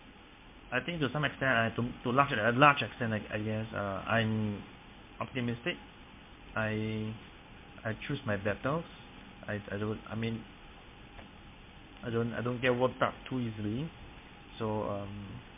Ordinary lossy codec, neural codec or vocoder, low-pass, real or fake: MP3, 32 kbps; codec, 16 kHz in and 24 kHz out, 1 kbps, XY-Tokenizer; 3.6 kHz; fake